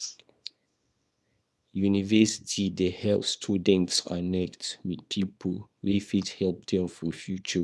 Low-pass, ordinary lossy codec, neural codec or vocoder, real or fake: none; none; codec, 24 kHz, 0.9 kbps, WavTokenizer, small release; fake